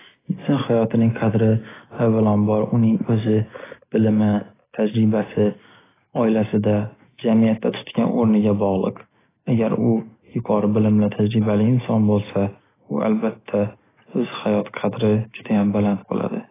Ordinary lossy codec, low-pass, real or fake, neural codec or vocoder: AAC, 16 kbps; 3.6 kHz; real; none